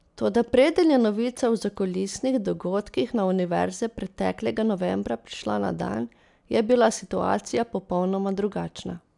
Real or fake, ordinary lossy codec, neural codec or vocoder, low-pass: real; none; none; 10.8 kHz